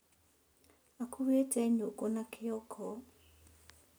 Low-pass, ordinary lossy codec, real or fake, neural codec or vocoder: none; none; real; none